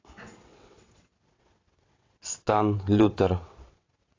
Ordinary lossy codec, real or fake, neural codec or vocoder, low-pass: AAC, 32 kbps; fake; vocoder, 22.05 kHz, 80 mel bands, WaveNeXt; 7.2 kHz